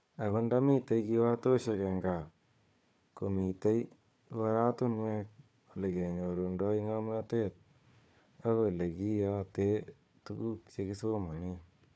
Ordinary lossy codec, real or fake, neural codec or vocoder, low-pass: none; fake; codec, 16 kHz, 4 kbps, FunCodec, trained on Chinese and English, 50 frames a second; none